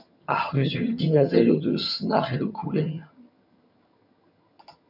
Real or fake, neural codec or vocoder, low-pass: fake; vocoder, 22.05 kHz, 80 mel bands, HiFi-GAN; 5.4 kHz